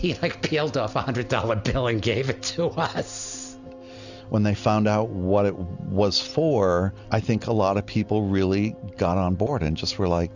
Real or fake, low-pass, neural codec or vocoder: real; 7.2 kHz; none